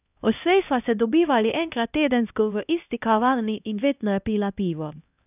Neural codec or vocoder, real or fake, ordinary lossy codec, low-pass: codec, 16 kHz, 1 kbps, X-Codec, HuBERT features, trained on LibriSpeech; fake; none; 3.6 kHz